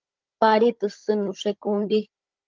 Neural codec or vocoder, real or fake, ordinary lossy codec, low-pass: codec, 16 kHz, 16 kbps, FunCodec, trained on Chinese and English, 50 frames a second; fake; Opus, 32 kbps; 7.2 kHz